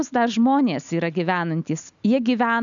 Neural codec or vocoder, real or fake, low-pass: none; real; 7.2 kHz